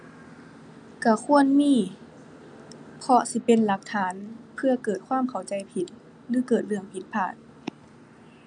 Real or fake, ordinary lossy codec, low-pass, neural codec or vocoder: real; none; 9.9 kHz; none